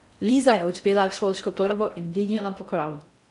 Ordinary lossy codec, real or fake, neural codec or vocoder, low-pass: none; fake; codec, 16 kHz in and 24 kHz out, 0.6 kbps, FocalCodec, streaming, 4096 codes; 10.8 kHz